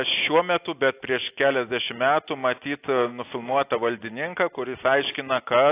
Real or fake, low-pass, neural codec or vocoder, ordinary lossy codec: real; 3.6 kHz; none; AAC, 24 kbps